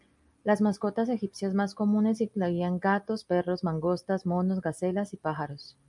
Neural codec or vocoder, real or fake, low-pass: none; real; 10.8 kHz